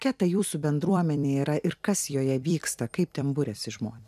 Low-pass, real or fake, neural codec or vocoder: 14.4 kHz; fake; vocoder, 44.1 kHz, 128 mel bands every 256 samples, BigVGAN v2